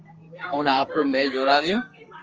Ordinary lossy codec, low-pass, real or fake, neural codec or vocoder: Opus, 24 kbps; 7.2 kHz; fake; codec, 32 kHz, 1.9 kbps, SNAC